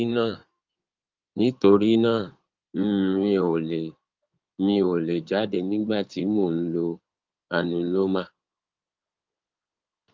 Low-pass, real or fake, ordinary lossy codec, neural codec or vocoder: 7.2 kHz; fake; Opus, 32 kbps; codec, 16 kHz in and 24 kHz out, 2.2 kbps, FireRedTTS-2 codec